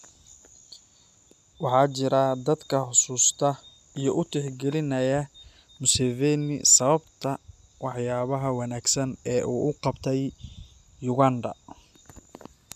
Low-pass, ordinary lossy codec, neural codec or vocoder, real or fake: 14.4 kHz; none; none; real